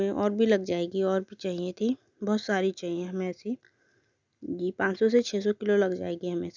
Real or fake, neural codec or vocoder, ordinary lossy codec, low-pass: real; none; none; 7.2 kHz